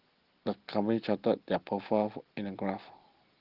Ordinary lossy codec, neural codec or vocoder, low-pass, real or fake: Opus, 16 kbps; none; 5.4 kHz; real